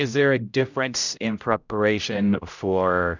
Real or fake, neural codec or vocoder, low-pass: fake; codec, 16 kHz, 0.5 kbps, X-Codec, HuBERT features, trained on general audio; 7.2 kHz